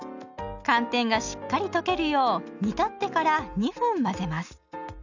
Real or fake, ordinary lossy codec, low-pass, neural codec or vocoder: real; none; 7.2 kHz; none